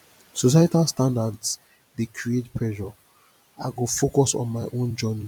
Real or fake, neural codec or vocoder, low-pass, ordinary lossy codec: fake; vocoder, 44.1 kHz, 128 mel bands every 256 samples, BigVGAN v2; 19.8 kHz; none